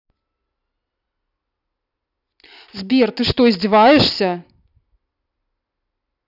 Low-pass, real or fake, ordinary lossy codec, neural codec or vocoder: 5.4 kHz; real; none; none